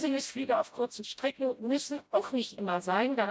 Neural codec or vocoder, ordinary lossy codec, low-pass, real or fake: codec, 16 kHz, 0.5 kbps, FreqCodec, smaller model; none; none; fake